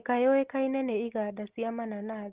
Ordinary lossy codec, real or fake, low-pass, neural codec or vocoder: Opus, 16 kbps; real; 3.6 kHz; none